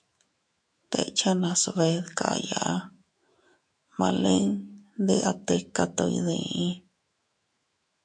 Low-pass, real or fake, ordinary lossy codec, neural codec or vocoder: 9.9 kHz; fake; MP3, 64 kbps; autoencoder, 48 kHz, 128 numbers a frame, DAC-VAE, trained on Japanese speech